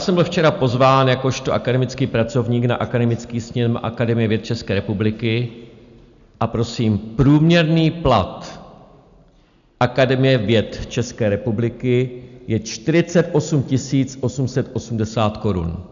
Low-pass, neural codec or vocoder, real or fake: 7.2 kHz; none; real